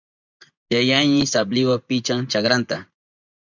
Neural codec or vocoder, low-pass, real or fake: vocoder, 24 kHz, 100 mel bands, Vocos; 7.2 kHz; fake